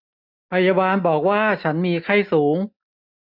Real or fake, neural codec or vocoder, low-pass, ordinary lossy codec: real; none; 5.4 kHz; none